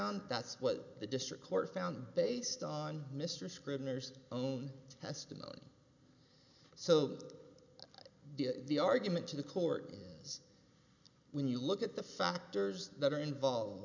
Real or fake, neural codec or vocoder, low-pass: real; none; 7.2 kHz